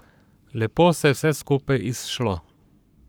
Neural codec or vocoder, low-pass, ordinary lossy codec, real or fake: codec, 44.1 kHz, 7.8 kbps, Pupu-Codec; none; none; fake